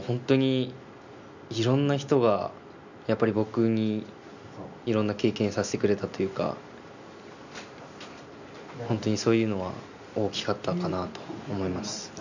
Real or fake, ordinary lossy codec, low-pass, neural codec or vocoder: real; none; 7.2 kHz; none